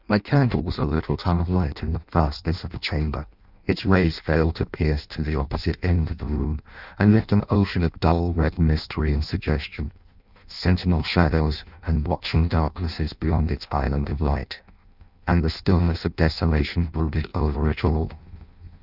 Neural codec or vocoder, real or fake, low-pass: codec, 16 kHz in and 24 kHz out, 0.6 kbps, FireRedTTS-2 codec; fake; 5.4 kHz